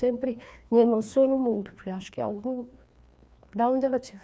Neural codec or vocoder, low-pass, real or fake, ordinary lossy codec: codec, 16 kHz, 2 kbps, FreqCodec, larger model; none; fake; none